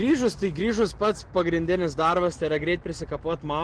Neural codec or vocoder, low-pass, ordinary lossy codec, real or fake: none; 9.9 kHz; Opus, 16 kbps; real